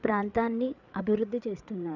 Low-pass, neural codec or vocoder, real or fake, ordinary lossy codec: 7.2 kHz; codec, 16 kHz, 8 kbps, FreqCodec, larger model; fake; none